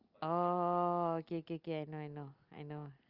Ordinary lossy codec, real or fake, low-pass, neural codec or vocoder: Opus, 24 kbps; real; 5.4 kHz; none